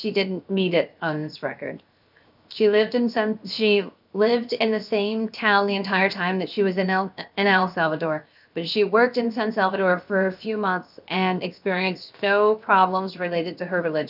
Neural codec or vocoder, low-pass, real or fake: codec, 16 kHz, 0.7 kbps, FocalCodec; 5.4 kHz; fake